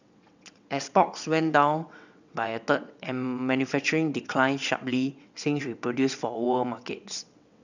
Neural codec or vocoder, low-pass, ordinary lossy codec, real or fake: vocoder, 22.05 kHz, 80 mel bands, WaveNeXt; 7.2 kHz; none; fake